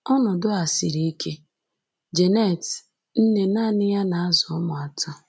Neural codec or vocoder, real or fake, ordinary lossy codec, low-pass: none; real; none; none